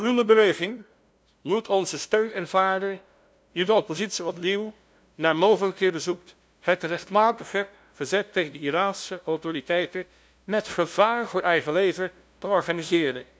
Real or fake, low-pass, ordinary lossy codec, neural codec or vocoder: fake; none; none; codec, 16 kHz, 0.5 kbps, FunCodec, trained on LibriTTS, 25 frames a second